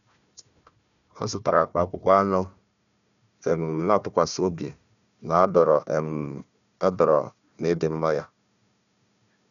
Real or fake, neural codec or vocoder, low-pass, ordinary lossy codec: fake; codec, 16 kHz, 1 kbps, FunCodec, trained on Chinese and English, 50 frames a second; 7.2 kHz; none